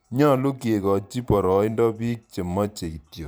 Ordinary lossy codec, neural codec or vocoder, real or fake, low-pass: none; vocoder, 44.1 kHz, 128 mel bands every 512 samples, BigVGAN v2; fake; none